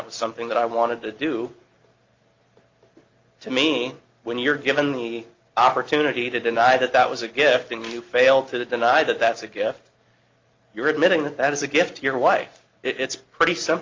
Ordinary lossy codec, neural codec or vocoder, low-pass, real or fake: Opus, 24 kbps; none; 7.2 kHz; real